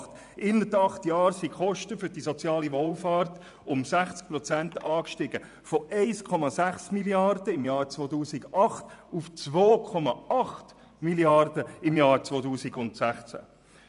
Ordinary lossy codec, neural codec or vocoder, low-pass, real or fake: none; vocoder, 24 kHz, 100 mel bands, Vocos; 10.8 kHz; fake